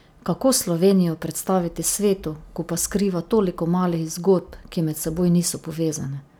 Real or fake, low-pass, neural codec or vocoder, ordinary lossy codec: real; none; none; none